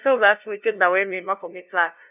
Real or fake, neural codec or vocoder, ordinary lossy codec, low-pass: fake; codec, 16 kHz, 0.5 kbps, FunCodec, trained on LibriTTS, 25 frames a second; none; 3.6 kHz